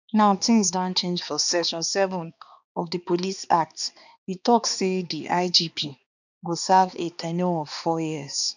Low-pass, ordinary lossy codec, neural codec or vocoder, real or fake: 7.2 kHz; none; codec, 16 kHz, 2 kbps, X-Codec, HuBERT features, trained on balanced general audio; fake